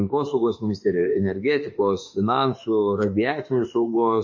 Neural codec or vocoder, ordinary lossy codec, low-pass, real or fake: autoencoder, 48 kHz, 32 numbers a frame, DAC-VAE, trained on Japanese speech; MP3, 32 kbps; 7.2 kHz; fake